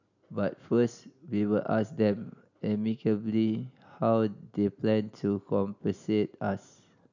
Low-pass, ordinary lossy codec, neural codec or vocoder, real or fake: 7.2 kHz; none; none; real